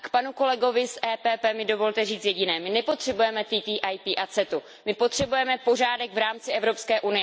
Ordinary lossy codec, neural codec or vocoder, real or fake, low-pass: none; none; real; none